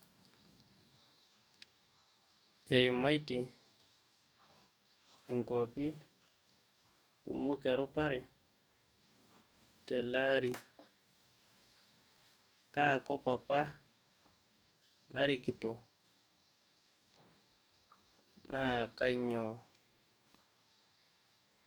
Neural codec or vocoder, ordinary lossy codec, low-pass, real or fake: codec, 44.1 kHz, 2.6 kbps, DAC; none; none; fake